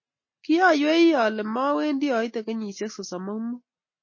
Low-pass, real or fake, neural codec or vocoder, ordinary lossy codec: 7.2 kHz; real; none; MP3, 32 kbps